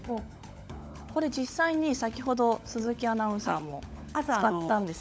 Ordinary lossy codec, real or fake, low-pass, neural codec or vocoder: none; fake; none; codec, 16 kHz, 16 kbps, FunCodec, trained on LibriTTS, 50 frames a second